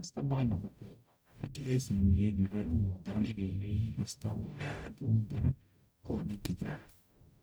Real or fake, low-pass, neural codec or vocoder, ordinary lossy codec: fake; none; codec, 44.1 kHz, 0.9 kbps, DAC; none